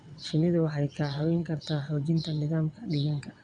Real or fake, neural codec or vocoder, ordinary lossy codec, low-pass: fake; vocoder, 22.05 kHz, 80 mel bands, WaveNeXt; none; 9.9 kHz